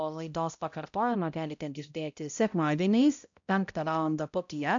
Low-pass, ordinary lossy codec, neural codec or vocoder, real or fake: 7.2 kHz; AAC, 64 kbps; codec, 16 kHz, 0.5 kbps, X-Codec, HuBERT features, trained on balanced general audio; fake